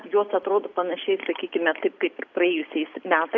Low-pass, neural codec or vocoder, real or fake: 7.2 kHz; none; real